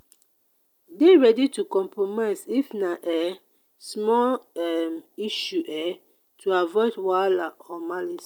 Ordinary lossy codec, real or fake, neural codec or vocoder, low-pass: none; real; none; 19.8 kHz